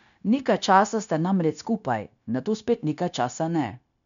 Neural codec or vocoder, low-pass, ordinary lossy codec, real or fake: codec, 16 kHz, 0.9 kbps, LongCat-Audio-Codec; 7.2 kHz; none; fake